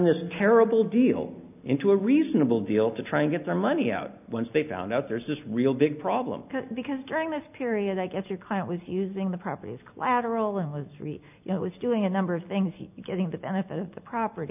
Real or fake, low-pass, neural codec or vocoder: real; 3.6 kHz; none